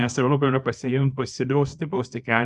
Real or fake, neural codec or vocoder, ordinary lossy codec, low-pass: fake; codec, 24 kHz, 0.9 kbps, WavTokenizer, small release; Opus, 64 kbps; 10.8 kHz